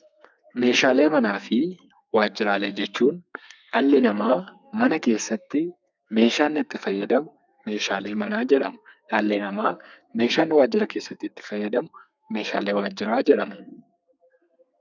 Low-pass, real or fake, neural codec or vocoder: 7.2 kHz; fake; codec, 32 kHz, 1.9 kbps, SNAC